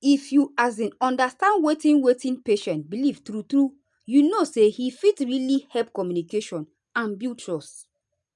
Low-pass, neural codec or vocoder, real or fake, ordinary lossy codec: 10.8 kHz; none; real; none